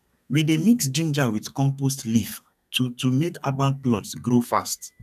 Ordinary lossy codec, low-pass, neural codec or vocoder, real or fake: none; 14.4 kHz; codec, 32 kHz, 1.9 kbps, SNAC; fake